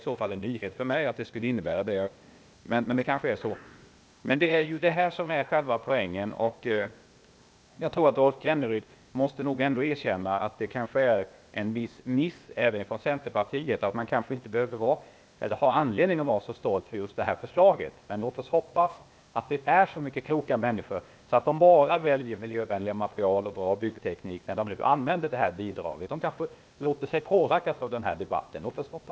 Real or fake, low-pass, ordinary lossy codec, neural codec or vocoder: fake; none; none; codec, 16 kHz, 0.8 kbps, ZipCodec